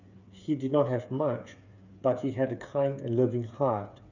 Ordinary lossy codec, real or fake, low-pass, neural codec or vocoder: none; fake; 7.2 kHz; codec, 16 kHz, 8 kbps, FreqCodec, smaller model